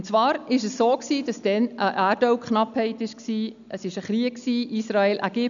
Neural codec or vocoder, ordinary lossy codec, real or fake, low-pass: none; none; real; 7.2 kHz